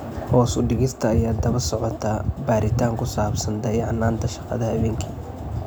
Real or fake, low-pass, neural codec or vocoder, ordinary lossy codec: real; none; none; none